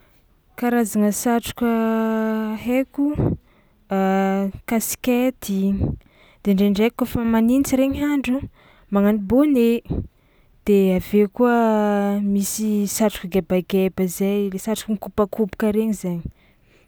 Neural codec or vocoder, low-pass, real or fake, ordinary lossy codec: none; none; real; none